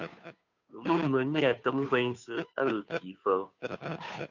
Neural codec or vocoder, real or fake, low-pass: codec, 16 kHz, 2 kbps, FunCodec, trained on Chinese and English, 25 frames a second; fake; 7.2 kHz